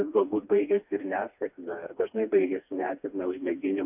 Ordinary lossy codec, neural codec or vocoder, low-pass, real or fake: AAC, 32 kbps; codec, 16 kHz, 2 kbps, FreqCodec, smaller model; 3.6 kHz; fake